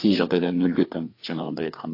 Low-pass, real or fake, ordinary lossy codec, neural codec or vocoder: 5.4 kHz; fake; AAC, 32 kbps; codec, 16 kHz, 4 kbps, FreqCodec, larger model